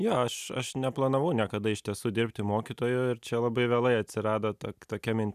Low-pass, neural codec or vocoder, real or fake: 14.4 kHz; none; real